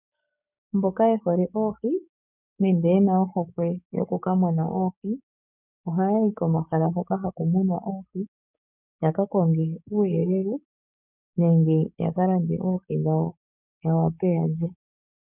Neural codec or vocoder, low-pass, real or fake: codec, 16 kHz, 6 kbps, DAC; 3.6 kHz; fake